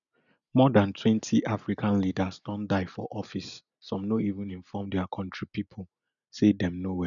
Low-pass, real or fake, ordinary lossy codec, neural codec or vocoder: 7.2 kHz; real; none; none